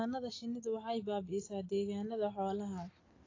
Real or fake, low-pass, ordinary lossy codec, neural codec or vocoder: real; 7.2 kHz; AAC, 32 kbps; none